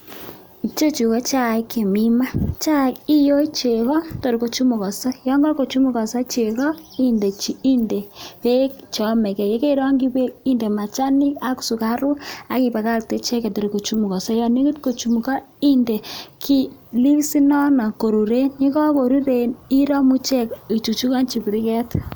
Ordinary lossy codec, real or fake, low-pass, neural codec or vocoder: none; real; none; none